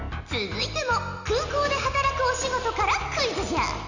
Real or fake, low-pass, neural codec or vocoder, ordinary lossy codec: real; 7.2 kHz; none; Opus, 64 kbps